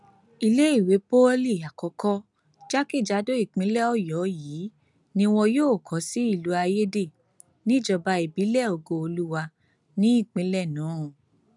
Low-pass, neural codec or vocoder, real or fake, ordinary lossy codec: 10.8 kHz; none; real; none